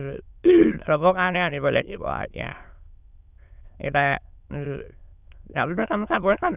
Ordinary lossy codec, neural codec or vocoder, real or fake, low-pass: none; autoencoder, 22.05 kHz, a latent of 192 numbers a frame, VITS, trained on many speakers; fake; 3.6 kHz